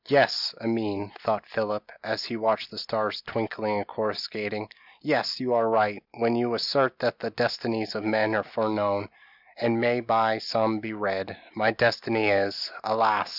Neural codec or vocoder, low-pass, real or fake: none; 5.4 kHz; real